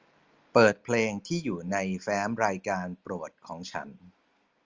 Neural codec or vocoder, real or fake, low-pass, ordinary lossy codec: none; real; 7.2 kHz; Opus, 32 kbps